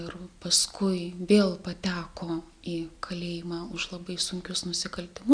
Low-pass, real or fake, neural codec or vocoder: 9.9 kHz; real; none